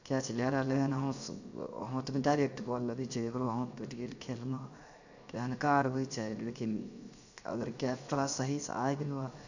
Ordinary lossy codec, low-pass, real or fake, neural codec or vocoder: none; 7.2 kHz; fake; codec, 16 kHz, 0.7 kbps, FocalCodec